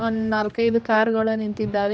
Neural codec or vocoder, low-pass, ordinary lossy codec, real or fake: codec, 16 kHz, 2 kbps, X-Codec, HuBERT features, trained on general audio; none; none; fake